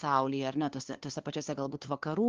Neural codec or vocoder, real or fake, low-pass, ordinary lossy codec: codec, 16 kHz, 2 kbps, FunCodec, trained on LibriTTS, 25 frames a second; fake; 7.2 kHz; Opus, 16 kbps